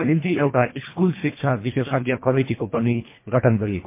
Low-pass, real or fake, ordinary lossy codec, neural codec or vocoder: 3.6 kHz; fake; MP3, 24 kbps; codec, 24 kHz, 1.5 kbps, HILCodec